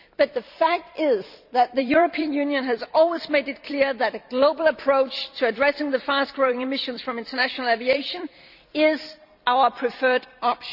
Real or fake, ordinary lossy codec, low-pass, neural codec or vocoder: fake; none; 5.4 kHz; vocoder, 44.1 kHz, 128 mel bands every 256 samples, BigVGAN v2